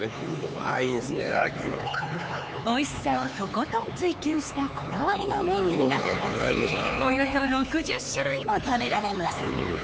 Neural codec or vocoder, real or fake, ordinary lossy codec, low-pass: codec, 16 kHz, 4 kbps, X-Codec, HuBERT features, trained on LibriSpeech; fake; none; none